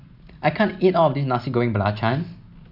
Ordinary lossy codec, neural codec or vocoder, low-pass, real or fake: none; none; 5.4 kHz; real